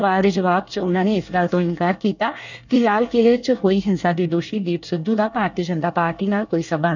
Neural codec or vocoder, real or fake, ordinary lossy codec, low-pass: codec, 24 kHz, 1 kbps, SNAC; fake; none; 7.2 kHz